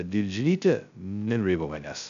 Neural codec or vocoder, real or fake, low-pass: codec, 16 kHz, 0.2 kbps, FocalCodec; fake; 7.2 kHz